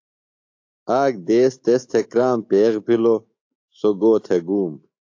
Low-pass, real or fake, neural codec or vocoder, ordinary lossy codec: 7.2 kHz; fake; autoencoder, 48 kHz, 128 numbers a frame, DAC-VAE, trained on Japanese speech; AAC, 48 kbps